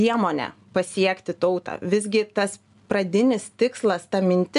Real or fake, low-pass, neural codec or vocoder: real; 10.8 kHz; none